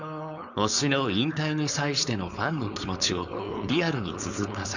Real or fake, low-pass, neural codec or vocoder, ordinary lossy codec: fake; 7.2 kHz; codec, 16 kHz, 4.8 kbps, FACodec; none